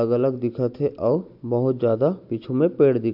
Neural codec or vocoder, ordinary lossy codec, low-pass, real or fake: none; AAC, 48 kbps; 5.4 kHz; real